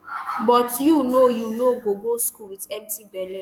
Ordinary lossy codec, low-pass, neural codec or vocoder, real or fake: none; 19.8 kHz; codec, 44.1 kHz, 7.8 kbps, DAC; fake